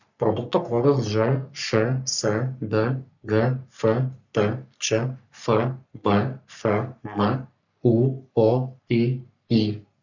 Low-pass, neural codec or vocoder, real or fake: 7.2 kHz; codec, 44.1 kHz, 3.4 kbps, Pupu-Codec; fake